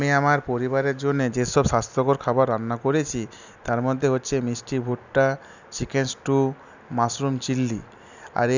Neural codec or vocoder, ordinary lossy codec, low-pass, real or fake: none; none; 7.2 kHz; real